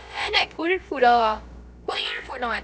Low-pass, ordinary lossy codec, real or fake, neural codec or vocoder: none; none; fake; codec, 16 kHz, about 1 kbps, DyCAST, with the encoder's durations